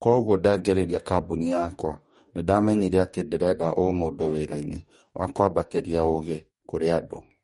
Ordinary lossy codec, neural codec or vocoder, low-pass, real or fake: MP3, 48 kbps; codec, 44.1 kHz, 2.6 kbps, DAC; 19.8 kHz; fake